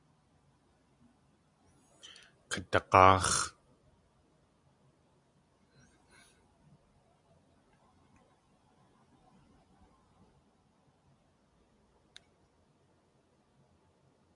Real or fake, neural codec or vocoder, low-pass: real; none; 10.8 kHz